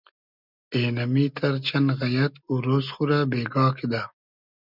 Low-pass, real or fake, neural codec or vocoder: 5.4 kHz; real; none